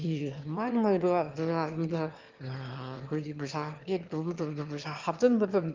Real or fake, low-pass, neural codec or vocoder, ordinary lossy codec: fake; 7.2 kHz; autoencoder, 22.05 kHz, a latent of 192 numbers a frame, VITS, trained on one speaker; Opus, 24 kbps